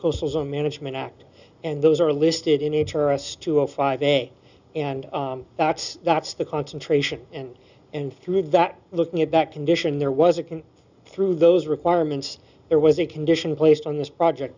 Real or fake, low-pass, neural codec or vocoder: real; 7.2 kHz; none